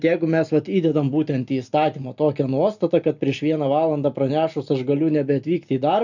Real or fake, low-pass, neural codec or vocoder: real; 7.2 kHz; none